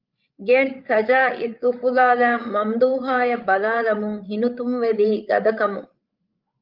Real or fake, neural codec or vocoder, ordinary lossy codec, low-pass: fake; codec, 16 kHz, 16 kbps, FreqCodec, larger model; Opus, 32 kbps; 5.4 kHz